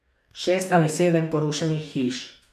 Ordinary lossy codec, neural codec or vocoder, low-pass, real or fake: none; codec, 44.1 kHz, 2.6 kbps, DAC; 14.4 kHz; fake